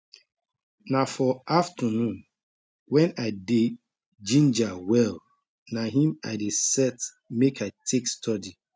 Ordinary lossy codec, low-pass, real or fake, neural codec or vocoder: none; none; real; none